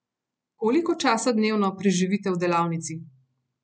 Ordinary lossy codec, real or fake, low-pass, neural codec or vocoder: none; real; none; none